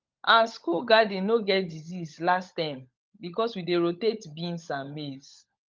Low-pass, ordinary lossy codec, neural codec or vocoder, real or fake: 7.2 kHz; Opus, 32 kbps; codec, 16 kHz, 16 kbps, FunCodec, trained on LibriTTS, 50 frames a second; fake